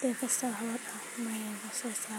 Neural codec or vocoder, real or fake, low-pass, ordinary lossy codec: none; real; none; none